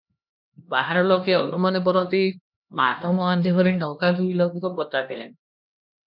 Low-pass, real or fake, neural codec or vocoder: 5.4 kHz; fake; codec, 16 kHz, 1 kbps, X-Codec, HuBERT features, trained on LibriSpeech